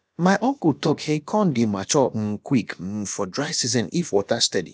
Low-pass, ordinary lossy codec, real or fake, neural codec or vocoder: none; none; fake; codec, 16 kHz, about 1 kbps, DyCAST, with the encoder's durations